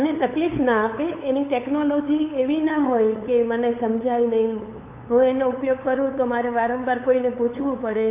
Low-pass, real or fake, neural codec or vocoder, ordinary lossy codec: 3.6 kHz; fake; codec, 16 kHz, 8 kbps, FunCodec, trained on LibriTTS, 25 frames a second; MP3, 24 kbps